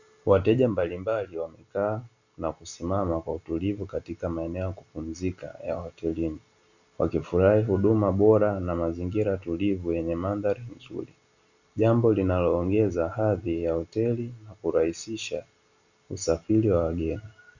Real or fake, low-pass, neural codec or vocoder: real; 7.2 kHz; none